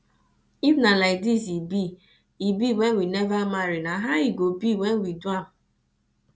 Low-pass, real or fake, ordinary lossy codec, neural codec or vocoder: none; real; none; none